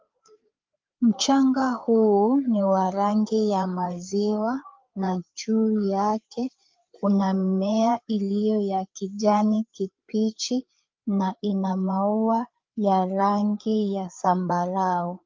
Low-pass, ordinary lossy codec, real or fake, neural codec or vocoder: 7.2 kHz; Opus, 24 kbps; fake; codec, 16 kHz, 4 kbps, FreqCodec, larger model